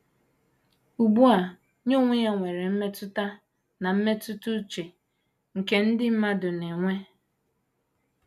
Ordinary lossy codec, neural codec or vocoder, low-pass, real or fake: none; none; 14.4 kHz; real